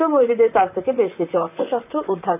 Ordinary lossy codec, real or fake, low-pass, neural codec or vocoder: none; fake; 3.6 kHz; vocoder, 44.1 kHz, 128 mel bands, Pupu-Vocoder